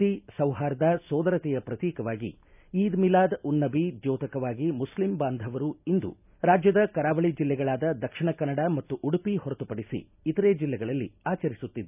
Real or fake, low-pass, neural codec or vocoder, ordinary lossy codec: real; 3.6 kHz; none; none